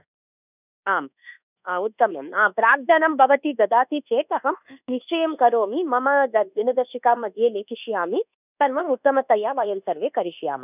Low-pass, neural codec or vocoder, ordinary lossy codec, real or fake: 3.6 kHz; codec, 24 kHz, 1.2 kbps, DualCodec; none; fake